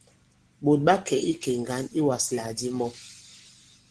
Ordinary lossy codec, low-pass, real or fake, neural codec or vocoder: Opus, 16 kbps; 10.8 kHz; real; none